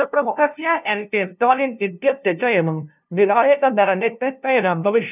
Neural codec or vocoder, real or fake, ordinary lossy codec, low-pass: codec, 16 kHz, 0.5 kbps, FunCodec, trained on LibriTTS, 25 frames a second; fake; none; 3.6 kHz